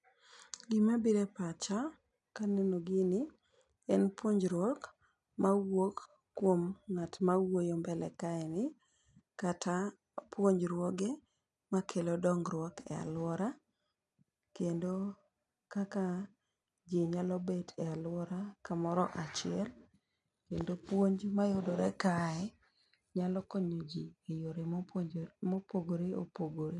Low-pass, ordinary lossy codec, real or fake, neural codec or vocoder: 10.8 kHz; none; real; none